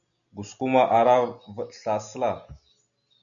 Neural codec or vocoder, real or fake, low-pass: none; real; 7.2 kHz